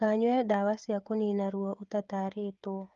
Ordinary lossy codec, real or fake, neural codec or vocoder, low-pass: Opus, 32 kbps; fake; codec, 16 kHz, 16 kbps, FreqCodec, smaller model; 7.2 kHz